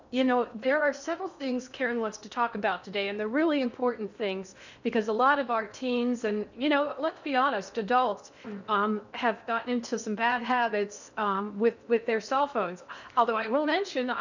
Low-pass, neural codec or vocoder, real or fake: 7.2 kHz; codec, 16 kHz in and 24 kHz out, 0.8 kbps, FocalCodec, streaming, 65536 codes; fake